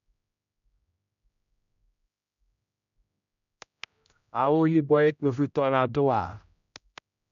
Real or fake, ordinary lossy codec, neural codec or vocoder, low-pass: fake; none; codec, 16 kHz, 0.5 kbps, X-Codec, HuBERT features, trained on general audio; 7.2 kHz